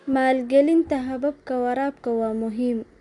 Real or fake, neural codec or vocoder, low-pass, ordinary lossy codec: real; none; 10.8 kHz; none